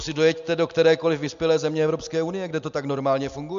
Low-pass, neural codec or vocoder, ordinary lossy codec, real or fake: 7.2 kHz; none; MP3, 64 kbps; real